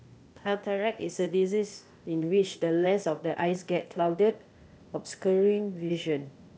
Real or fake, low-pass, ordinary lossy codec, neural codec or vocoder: fake; none; none; codec, 16 kHz, 0.8 kbps, ZipCodec